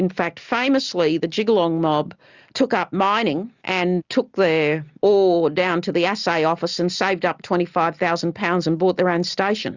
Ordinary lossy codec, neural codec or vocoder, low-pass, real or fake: Opus, 64 kbps; none; 7.2 kHz; real